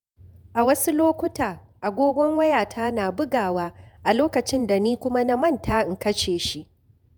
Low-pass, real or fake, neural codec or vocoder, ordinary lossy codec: none; fake; vocoder, 48 kHz, 128 mel bands, Vocos; none